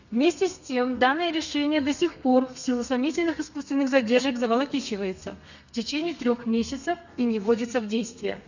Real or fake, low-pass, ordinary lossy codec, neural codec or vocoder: fake; 7.2 kHz; none; codec, 32 kHz, 1.9 kbps, SNAC